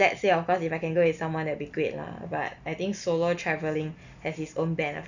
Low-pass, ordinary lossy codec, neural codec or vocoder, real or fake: 7.2 kHz; none; none; real